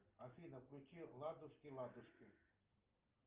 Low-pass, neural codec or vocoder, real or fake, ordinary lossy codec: 3.6 kHz; none; real; Opus, 24 kbps